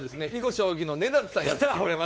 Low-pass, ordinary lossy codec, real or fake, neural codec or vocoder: none; none; fake; codec, 16 kHz, 4 kbps, X-Codec, WavLM features, trained on Multilingual LibriSpeech